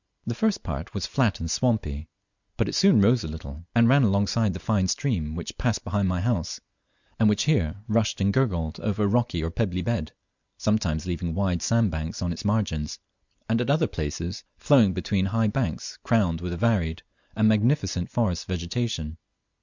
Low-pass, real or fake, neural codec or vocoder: 7.2 kHz; real; none